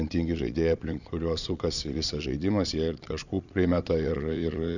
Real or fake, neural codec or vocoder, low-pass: fake; vocoder, 22.05 kHz, 80 mel bands, Vocos; 7.2 kHz